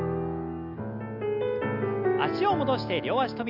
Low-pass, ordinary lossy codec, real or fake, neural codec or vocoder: 5.4 kHz; none; real; none